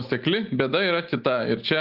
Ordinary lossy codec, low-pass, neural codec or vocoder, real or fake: Opus, 32 kbps; 5.4 kHz; none; real